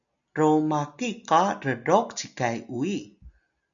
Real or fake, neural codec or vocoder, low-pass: real; none; 7.2 kHz